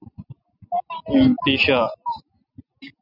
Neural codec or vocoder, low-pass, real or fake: none; 5.4 kHz; real